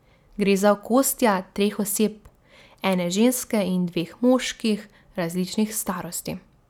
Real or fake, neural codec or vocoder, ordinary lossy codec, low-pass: real; none; none; 19.8 kHz